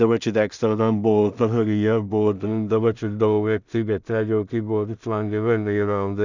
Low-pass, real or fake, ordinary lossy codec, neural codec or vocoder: 7.2 kHz; fake; none; codec, 16 kHz in and 24 kHz out, 0.4 kbps, LongCat-Audio-Codec, two codebook decoder